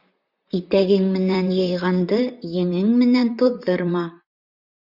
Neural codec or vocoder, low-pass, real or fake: vocoder, 44.1 kHz, 128 mel bands, Pupu-Vocoder; 5.4 kHz; fake